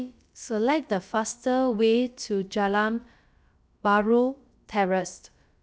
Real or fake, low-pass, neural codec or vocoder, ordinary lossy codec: fake; none; codec, 16 kHz, about 1 kbps, DyCAST, with the encoder's durations; none